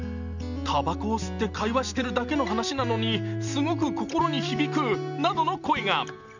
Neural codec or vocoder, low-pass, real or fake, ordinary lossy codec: none; 7.2 kHz; real; none